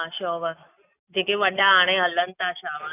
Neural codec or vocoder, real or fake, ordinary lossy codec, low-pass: none; real; none; 3.6 kHz